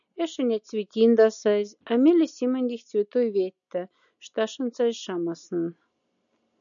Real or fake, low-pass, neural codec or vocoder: real; 7.2 kHz; none